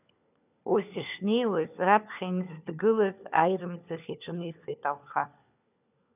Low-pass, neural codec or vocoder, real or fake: 3.6 kHz; codec, 16 kHz, 4 kbps, FunCodec, trained on LibriTTS, 50 frames a second; fake